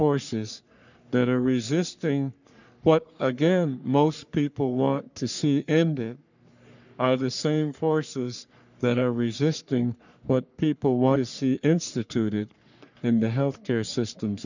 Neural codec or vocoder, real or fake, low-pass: codec, 44.1 kHz, 3.4 kbps, Pupu-Codec; fake; 7.2 kHz